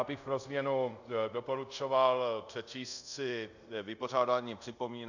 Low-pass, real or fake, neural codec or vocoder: 7.2 kHz; fake; codec, 24 kHz, 0.5 kbps, DualCodec